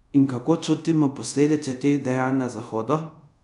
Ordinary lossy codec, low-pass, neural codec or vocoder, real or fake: none; 10.8 kHz; codec, 24 kHz, 0.5 kbps, DualCodec; fake